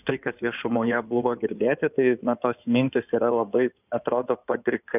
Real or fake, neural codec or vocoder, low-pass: fake; codec, 16 kHz in and 24 kHz out, 2.2 kbps, FireRedTTS-2 codec; 3.6 kHz